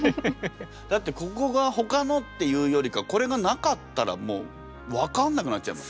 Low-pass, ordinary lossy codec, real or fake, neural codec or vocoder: none; none; real; none